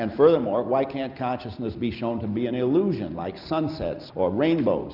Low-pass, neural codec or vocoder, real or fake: 5.4 kHz; none; real